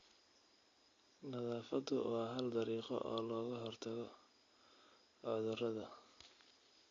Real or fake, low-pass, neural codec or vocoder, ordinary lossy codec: real; 7.2 kHz; none; AAC, 32 kbps